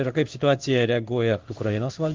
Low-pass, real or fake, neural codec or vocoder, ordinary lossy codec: 7.2 kHz; fake; codec, 16 kHz in and 24 kHz out, 1 kbps, XY-Tokenizer; Opus, 32 kbps